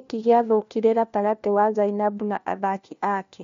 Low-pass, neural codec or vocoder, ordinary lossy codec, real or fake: 7.2 kHz; codec, 16 kHz, 1 kbps, FunCodec, trained on LibriTTS, 50 frames a second; MP3, 48 kbps; fake